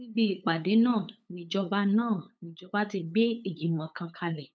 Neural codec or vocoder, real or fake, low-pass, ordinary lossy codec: codec, 16 kHz, 8 kbps, FunCodec, trained on LibriTTS, 25 frames a second; fake; none; none